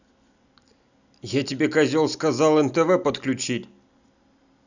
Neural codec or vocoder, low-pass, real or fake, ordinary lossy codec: none; 7.2 kHz; real; none